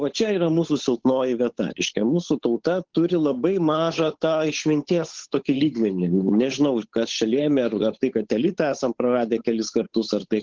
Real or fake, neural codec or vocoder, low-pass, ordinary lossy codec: fake; codec, 16 kHz, 8 kbps, FunCodec, trained on Chinese and English, 25 frames a second; 7.2 kHz; Opus, 16 kbps